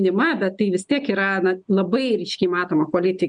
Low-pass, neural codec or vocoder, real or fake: 9.9 kHz; none; real